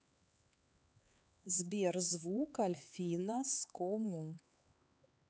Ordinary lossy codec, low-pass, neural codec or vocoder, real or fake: none; none; codec, 16 kHz, 4 kbps, X-Codec, HuBERT features, trained on LibriSpeech; fake